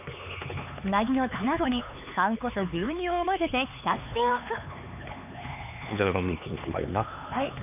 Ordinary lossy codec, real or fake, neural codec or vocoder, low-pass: none; fake; codec, 16 kHz, 4 kbps, X-Codec, HuBERT features, trained on LibriSpeech; 3.6 kHz